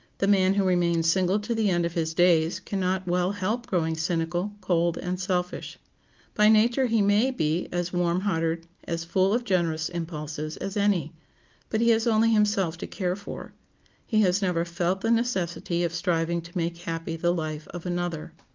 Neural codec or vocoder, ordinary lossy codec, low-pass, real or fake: none; Opus, 24 kbps; 7.2 kHz; real